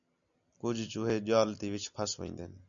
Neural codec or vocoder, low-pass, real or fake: none; 7.2 kHz; real